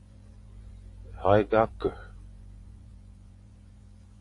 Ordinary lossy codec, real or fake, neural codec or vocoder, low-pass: AAC, 48 kbps; fake; vocoder, 24 kHz, 100 mel bands, Vocos; 10.8 kHz